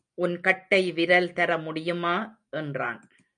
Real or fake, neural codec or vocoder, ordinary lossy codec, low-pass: real; none; MP3, 64 kbps; 9.9 kHz